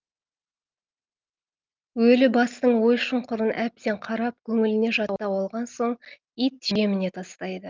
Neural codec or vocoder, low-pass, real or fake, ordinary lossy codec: none; 7.2 kHz; real; Opus, 32 kbps